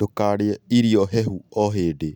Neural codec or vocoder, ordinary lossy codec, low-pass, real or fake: none; none; 19.8 kHz; real